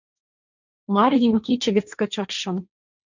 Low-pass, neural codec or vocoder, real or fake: 7.2 kHz; codec, 16 kHz, 1.1 kbps, Voila-Tokenizer; fake